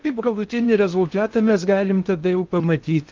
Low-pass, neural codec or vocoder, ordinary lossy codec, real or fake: 7.2 kHz; codec, 16 kHz in and 24 kHz out, 0.6 kbps, FocalCodec, streaming, 4096 codes; Opus, 24 kbps; fake